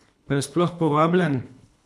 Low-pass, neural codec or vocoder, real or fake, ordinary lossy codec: none; codec, 24 kHz, 3 kbps, HILCodec; fake; none